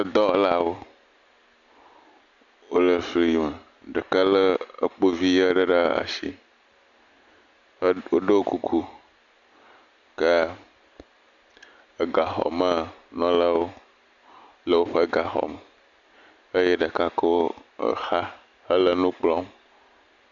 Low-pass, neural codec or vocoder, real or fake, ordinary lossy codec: 7.2 kHz; none; real; Opus, 64 kbps